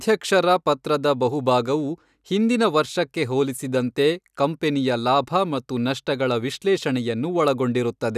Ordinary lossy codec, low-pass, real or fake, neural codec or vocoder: none; 14.4 kHz; real; none